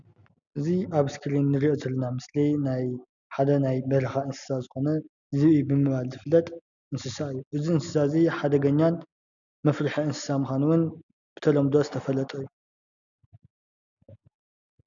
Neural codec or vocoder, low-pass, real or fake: none; 7.2 kHz; real